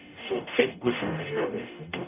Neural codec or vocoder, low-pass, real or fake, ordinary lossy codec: codec, 44.1 kHz, 0.9 kbps, DAC; 3.6 kHz; fake; none